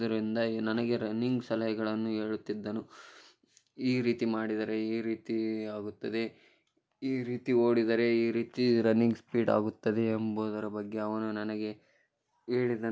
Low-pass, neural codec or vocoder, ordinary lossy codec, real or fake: none; none; none; real